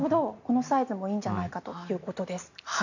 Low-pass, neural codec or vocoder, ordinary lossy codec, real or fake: 7.2 kHz; none; none; real